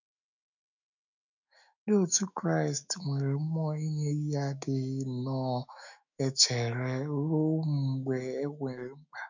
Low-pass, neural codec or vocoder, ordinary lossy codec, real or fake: 7.2 kHz; autoencoder, 48 kHz, 128 numbers a frame, DAC-VAE, trained on Japanese speech; none; fake